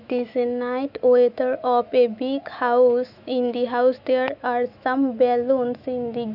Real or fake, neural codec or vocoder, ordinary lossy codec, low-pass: fake; autoencoder, 48 kHz, 128 numbers a frame, DAC-VAE, trained on Japanese speech; none; 5.4 kHz